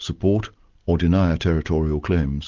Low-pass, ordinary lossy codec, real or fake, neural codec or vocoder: 7.2 kHz; Opus, 32 kbps; real; none